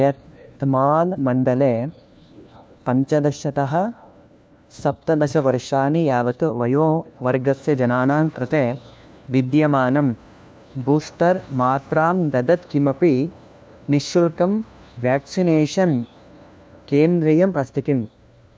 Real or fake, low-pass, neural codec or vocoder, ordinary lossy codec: fake; none; codec, 16 kHz, 1 kbps, FunCodec, trained on LibriTTS, 50 frames a second; none